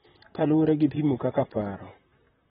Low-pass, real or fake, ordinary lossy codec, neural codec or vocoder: 19.8 kHz; fake; AAC, 16 kbps; vocoder, 44.1 kHz, 128 mel bands, Pupu-Vocoder